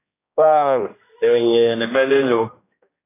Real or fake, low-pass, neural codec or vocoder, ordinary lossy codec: fake; 3.6 kHz; codec, 16 kHz, 1 kbps, X-Codec, HuBERT features, trained on general audio; AAC, 16 kbps